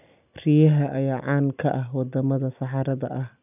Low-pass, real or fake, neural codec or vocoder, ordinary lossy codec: 3.6 kHz; real; none; none